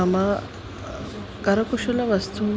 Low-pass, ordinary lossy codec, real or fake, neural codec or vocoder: none; none; real; none